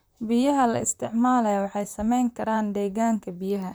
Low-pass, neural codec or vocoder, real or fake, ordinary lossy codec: none; vocoder, 44.1 kHz, 128 mel bands, Pupu-Vocoder; fake; none